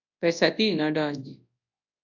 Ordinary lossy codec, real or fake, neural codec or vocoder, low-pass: AAC, 48 kbps; fake; codec, 24 kHz, 0.9 kbps, WavTokenizer, large speech release; 7.2 kHz